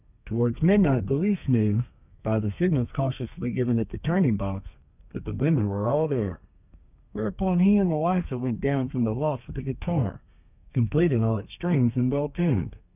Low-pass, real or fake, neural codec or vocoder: 3.6 kHz; fake; codec, 32 kHz, 1.9 kbps, SNAC